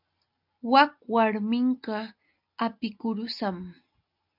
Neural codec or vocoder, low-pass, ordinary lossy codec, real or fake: none; 5.4 kHz; MP3, 48 kbps; real